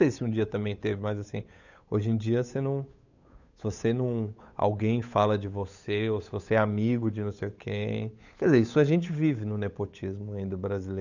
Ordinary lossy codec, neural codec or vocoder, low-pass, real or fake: none; codec, 16 kHz, 8 kbps, FunCodec, trained on Chinese and English, 25 frames a second; 7.2 kHz; fake